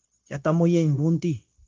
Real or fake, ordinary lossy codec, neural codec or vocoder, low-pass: fake; Opus, 24 kbps; codec, 16 kHz, 0.9 kbps, LongCat-Audio-Codec; 7.2 kHz